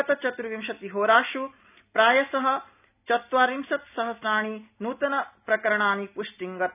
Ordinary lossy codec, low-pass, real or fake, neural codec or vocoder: none; 3.6 kHz; real; none